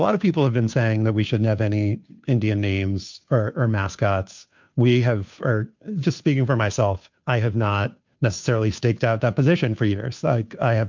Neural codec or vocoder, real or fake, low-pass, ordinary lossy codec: codec, 16 kHz, 2 kbps, FunCodec, trained on Chinese and English, 25 frames a second; fake; 7.2 kHz; MP3, 64 kbps